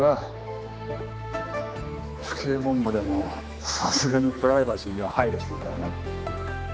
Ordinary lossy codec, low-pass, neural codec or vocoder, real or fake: none; none; codec, 16 kHz, 2 kbps, X-Codec, HuBERT features, trained on general audio; fake